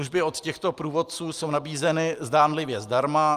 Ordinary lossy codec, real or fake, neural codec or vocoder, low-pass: Opus, 32 kbps; real; none; 14.4 kHz